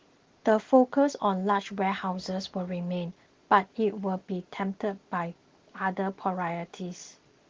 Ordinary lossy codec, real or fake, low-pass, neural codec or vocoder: Opus, 16 kbps; real; 7.2 kHz; none